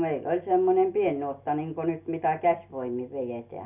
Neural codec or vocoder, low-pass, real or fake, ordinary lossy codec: none; 3.6 kHz; real; none